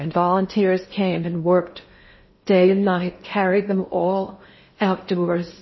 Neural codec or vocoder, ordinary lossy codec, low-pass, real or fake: codec, 16 kHz in and 24 kHz out, 0.6 kbps, FocalCodec, streaming, 2048 codes; MP3, 24 kbps; 7.2 kHz; fake